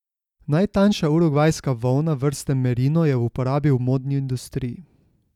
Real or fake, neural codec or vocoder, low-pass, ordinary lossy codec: real; none; 19.8 kHz; none